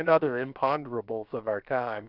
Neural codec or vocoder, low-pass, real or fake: codec, 16 kHz, about 1 kbps, DyCAST, with the encoder's durations; 5.4 kHz; fake